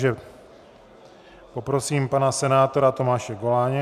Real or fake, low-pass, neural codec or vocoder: real; 14.4 kHz; none